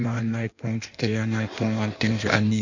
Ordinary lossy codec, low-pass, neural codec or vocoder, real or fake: none; 7.2 kHz; codec, 16 kHz in and 24 kHz out, 1.1 kbps, FireRedTTS-2 codec; fake